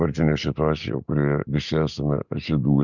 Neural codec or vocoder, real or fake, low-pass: none; real; 7.2 kHz